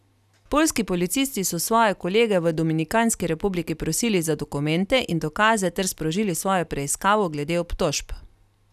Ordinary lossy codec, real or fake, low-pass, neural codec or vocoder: none; real; 14.4 kHz; none